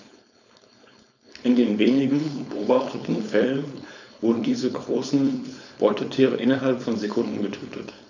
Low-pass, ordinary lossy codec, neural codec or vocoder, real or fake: 7.2 kHz; none; codec, 16 kHz, 4.8 kbps, FACodec; fake